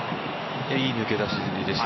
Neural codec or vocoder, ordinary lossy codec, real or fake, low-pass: none; MP3, 24 kbps; real; 7.2 kHz